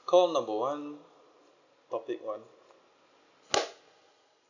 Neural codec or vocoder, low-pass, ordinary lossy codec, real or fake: none; 7.2 kHz; none; real